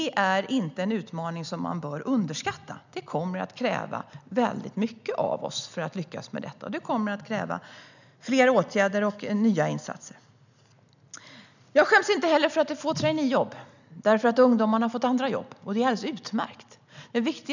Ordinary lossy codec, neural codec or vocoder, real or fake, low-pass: none; none; real; 7.2 kHz